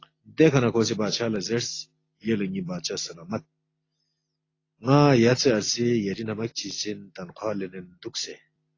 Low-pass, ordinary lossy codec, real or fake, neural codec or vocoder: 7.2 kHz; AAC, 32 kbps; real; none